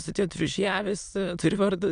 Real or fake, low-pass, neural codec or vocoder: fake; 9.9 kHz; autoencoder, 22.05 kHz, a latent of 192 numbers a frame, VITS, trained on many speakers